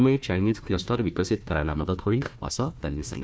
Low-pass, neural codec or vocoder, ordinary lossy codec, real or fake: none; codec, 16 kHz, 1 kbps, FunCodec, trained on Chinese and English, 50 frames a second; none; fake